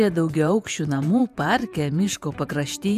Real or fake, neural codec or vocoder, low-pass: fake; vocoder, 48 kHz, 128 mel bands, Vocos; 14.4 kHz